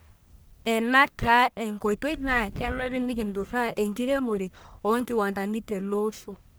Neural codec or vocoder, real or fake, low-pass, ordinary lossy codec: codec, 44.1 kHz, 1.7 kbps, Pupu-Codec; fake; none; none